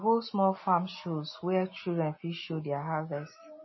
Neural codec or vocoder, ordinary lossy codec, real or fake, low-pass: none; MP3, 24 kbps; real; 7.2 kHz